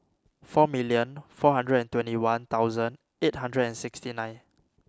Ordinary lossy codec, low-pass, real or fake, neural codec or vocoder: none; none; real; none